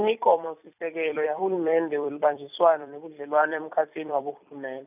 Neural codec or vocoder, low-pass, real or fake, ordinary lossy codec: vocoder, 44.1 kHz, 128 mel bands every 256 samples, BigVGAN v2; 3.6 kHz; fake; none